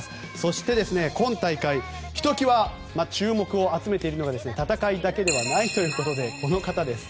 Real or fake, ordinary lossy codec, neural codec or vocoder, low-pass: real; none; none; none